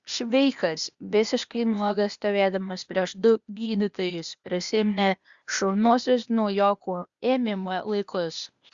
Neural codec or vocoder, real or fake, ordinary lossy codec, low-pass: codec, 16 kHz, 0.8 kbps, ZipCodec; fake; Opus, 64 kbps; 7.2 kHz